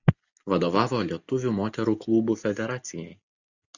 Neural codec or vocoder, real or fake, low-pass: none; real; 7.2 kHz